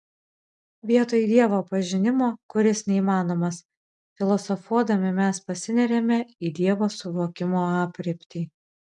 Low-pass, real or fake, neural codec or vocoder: 10.8 kHz; real; none